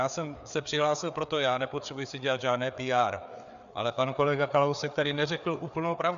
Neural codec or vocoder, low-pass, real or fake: codec, 16 kHz, 4 kbps, FreqCodec, larger model; 7.2 kHz; fake